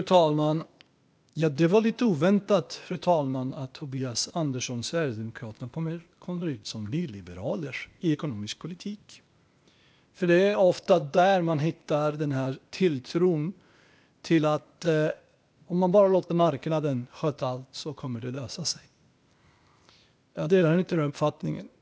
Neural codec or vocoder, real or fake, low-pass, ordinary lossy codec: codec, 16 kHz, 0.8 kbps, ZipCodec; fake; none; none